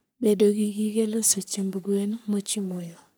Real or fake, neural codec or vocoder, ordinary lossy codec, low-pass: fake; codec, 44.1 kHz, 3.4 kbps, Pupu-Codec; none; none